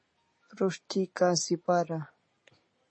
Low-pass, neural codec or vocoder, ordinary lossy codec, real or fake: 9.9 kHz; none; MP3, 32 kbps; real